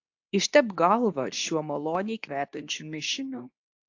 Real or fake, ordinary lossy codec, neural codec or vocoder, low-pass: fake; AAC, 48 kbps; codec, 24 kHz, 0.9 kbps, WavTokenizer, medium speech release version 2; 7.2 kHz